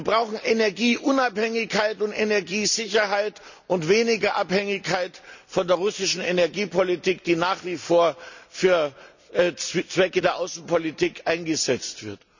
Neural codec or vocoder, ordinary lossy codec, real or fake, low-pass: none; none; real; 7.2 kHz